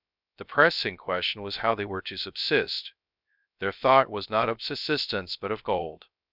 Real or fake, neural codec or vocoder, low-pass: fake; codec, 16 kHz, 0.3 kbps, FocalCodec; 5.4 kHz